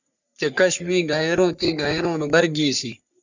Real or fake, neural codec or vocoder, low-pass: fake; codec, 44.1 kHz, 3.4 kbps, Pupu-Codec; 7.2 kHz